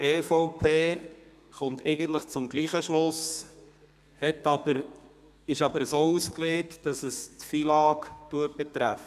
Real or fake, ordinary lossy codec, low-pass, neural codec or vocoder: fake; none; 14.4 kHz; codec, 32 kHz, 1.9 kbps, SNAC